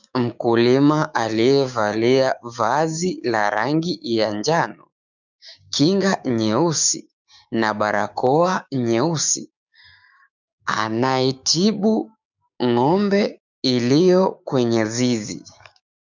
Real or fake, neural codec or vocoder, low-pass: fake; codec, 16 kHz, 6 kbps, DAC; 7.2 kHz